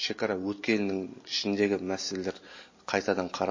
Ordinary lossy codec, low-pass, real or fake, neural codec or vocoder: MP3, 32 kbps; 7.2 kHz; real; none